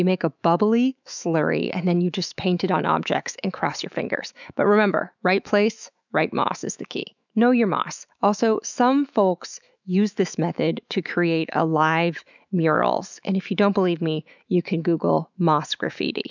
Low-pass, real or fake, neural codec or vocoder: 7.2 kHz; fake; autoencoder, 48 kHz, 128 numbers a frame, DAC-VAE, trained on Japanese speech